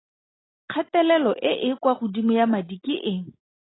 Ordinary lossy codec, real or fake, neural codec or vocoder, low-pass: AAC, 16 kbps; real; none; 7.2 kHz